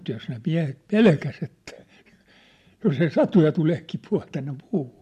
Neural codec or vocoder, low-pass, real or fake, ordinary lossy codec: none; 14.4 kHz; real; MP3, 64 kbps